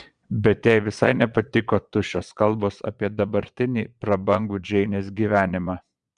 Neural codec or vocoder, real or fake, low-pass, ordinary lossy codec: vocoder, 22.05 kHz, 80 mel bands, Vocos; fake; 9.9 kHz; Opus, 64 kbps